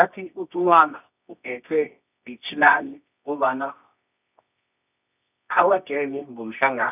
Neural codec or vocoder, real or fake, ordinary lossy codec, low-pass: codec, 24 kHz, 0.9 kbps, WavTokenizer, medium music audio release; fake; none; 3.6 kHz